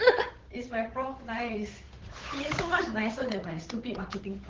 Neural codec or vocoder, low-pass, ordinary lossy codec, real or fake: codec, 16 kHz, 8 kbps, FunCodec, trained on Chinese and English, 25 frames a second; 7.2 kHz; Opus, 16 kbps; fake